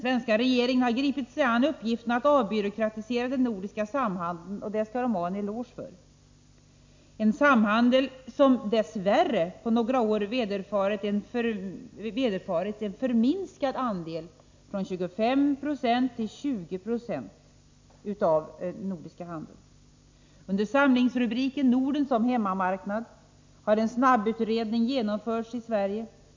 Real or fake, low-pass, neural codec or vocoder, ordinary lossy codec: real; 7.2 kHz; none; MP3, 64 kbps